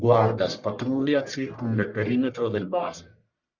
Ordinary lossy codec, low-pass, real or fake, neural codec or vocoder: Opus, 64 kbps; 7.2 kHz; fake; codec, 44.1 kHz, 1.7 kbps, Pupu-Codec